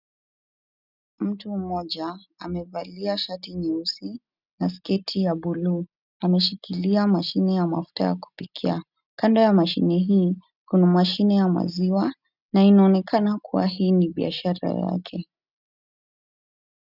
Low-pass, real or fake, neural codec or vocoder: 5.4 kHz; real; none